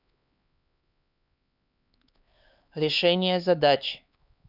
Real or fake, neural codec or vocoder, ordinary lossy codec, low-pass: fake; codec, 16 kHz, 4 kbps, X-Codec, HuBERT features, trained on LibriSpeech; none; 5.4 kHz